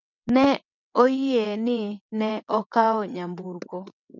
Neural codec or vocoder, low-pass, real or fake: vocoder, 22.05 kHz, 80 mel bands, WaveNeXt; 7.2 kHz; fake